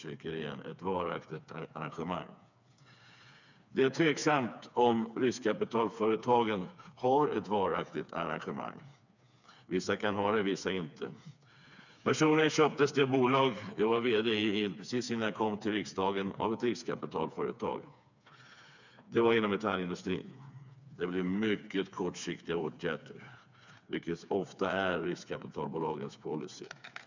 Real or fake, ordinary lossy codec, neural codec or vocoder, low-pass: fake; none; codec, 16 kHz, 4 kbps, FreqCodec, smaller model; 7.2 kHz